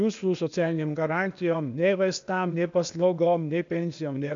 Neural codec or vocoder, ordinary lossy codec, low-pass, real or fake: codec, 16 kHz, 0.8 kbps, ZipCodec; MP3, 64 kbps; 7.2 kHz; fake